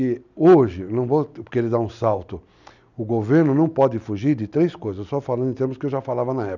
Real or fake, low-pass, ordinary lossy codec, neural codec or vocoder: real; 7.2 kHz; none; none